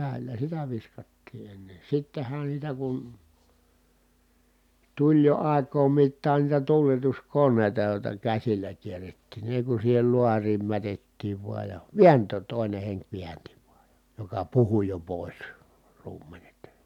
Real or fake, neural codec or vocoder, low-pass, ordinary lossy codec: real; none; 19.8 kHz; none